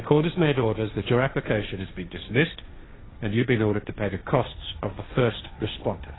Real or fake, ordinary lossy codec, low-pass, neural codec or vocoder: fake; AAC, 16 kbps; 7.2 kHz; codec, 16 kHz, 1.1 kbps, Voila-Tokenizer